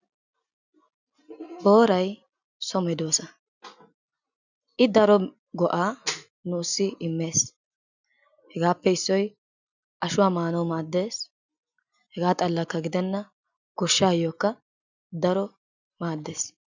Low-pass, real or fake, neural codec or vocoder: 7.2 kHz; real; none